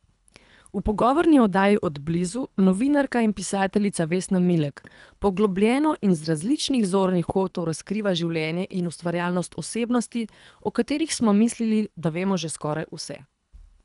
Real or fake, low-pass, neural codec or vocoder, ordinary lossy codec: fake; 10.8 kHz; codec, 24 kHz, 3 kbps, HILCodec; none